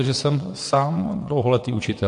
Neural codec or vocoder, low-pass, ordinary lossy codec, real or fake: vocoder, 22.05 kHz, 80 mel bands, WaveNeXt; 9.9 kHz; MP3, 48 kbps; fake